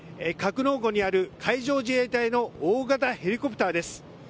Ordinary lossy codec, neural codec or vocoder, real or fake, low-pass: none; none; real; none